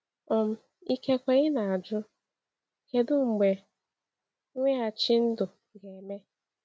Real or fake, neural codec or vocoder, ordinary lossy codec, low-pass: real; none; none; none